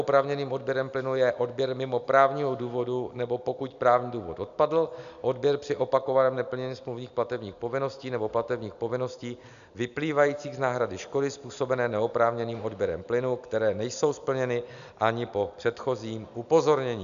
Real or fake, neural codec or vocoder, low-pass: real; none; 7.2 kHz